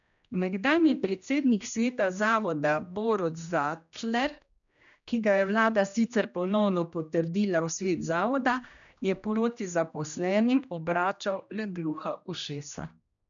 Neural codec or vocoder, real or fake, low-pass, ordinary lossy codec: codec, 16 kHz, 1 kbps, X-Codec, HuBERT features, trained on general audio; fake; 7.2 kHz; none